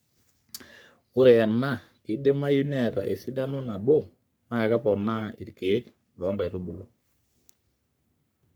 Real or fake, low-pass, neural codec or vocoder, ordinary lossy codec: fake; none; codec, 44.1 kHz, 3.4 kbps, Pupu-Codec; none